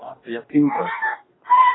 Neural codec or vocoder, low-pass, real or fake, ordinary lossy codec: codec, 44.1 kHz, 2.6 kbps, DAC; 7.2 kHz; fake; AAC, 16 kbps